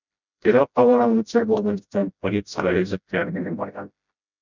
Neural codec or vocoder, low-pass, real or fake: codec, 16 kHz, 0.5 kbps, FreqCodec, smaller model; 7.2 kHz; fake